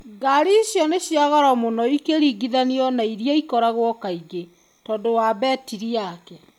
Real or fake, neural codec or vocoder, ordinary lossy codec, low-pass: real; none; none; 19.8 kHz